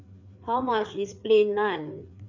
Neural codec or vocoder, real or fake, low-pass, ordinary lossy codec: codec, 16 kHz, 4 kbps, FreqCodec, larger model; fake; 7.2 kHz; none